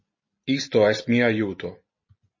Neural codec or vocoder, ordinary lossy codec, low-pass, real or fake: none; MP3, 32 kbps; 7.2 kHz; real